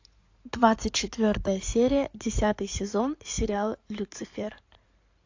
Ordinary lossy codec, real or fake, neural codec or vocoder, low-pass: AAC, 48 kbps; fake; codec, 16 kHz in and 24 kHz out, 2.2 kbps, FireRedTTS-2 codec; 7.2 kHz